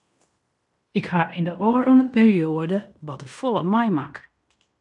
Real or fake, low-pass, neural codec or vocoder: fake; 10.8 kHz; codec, 16 kHz in and 24 kHz out, 0.9 kbps, LongCat-Audio-Codec, fine tuned four codebook decoder